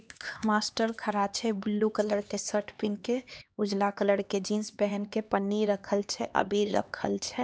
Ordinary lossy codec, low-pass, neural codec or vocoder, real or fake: none; none; codec, 16 kHz, 2 kbps, X-Codec, HuBERT features, trained on LibriSpeech; fake